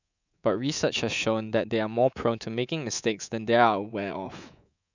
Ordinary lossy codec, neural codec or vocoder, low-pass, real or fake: none; codec, 24 kHz, 3.1 kbps, DualCodec; 7.2 kHz; fake